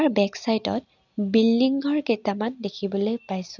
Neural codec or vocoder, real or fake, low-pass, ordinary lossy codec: none; real; 7.2 kHz; none